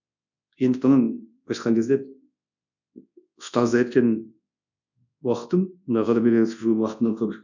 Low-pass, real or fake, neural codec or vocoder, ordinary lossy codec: 7.2 kHz; fake; codec, 24 kHz, 0.9 kbps, WavTokenizer, large speech release; none